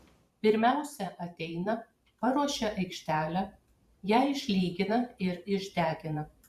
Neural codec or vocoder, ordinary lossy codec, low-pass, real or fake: vocoder, 44.1 kHz, 128 mel bands every 512 samples, BigVGAN v2; Opus, 64 kbps; 14.4 kHz; fake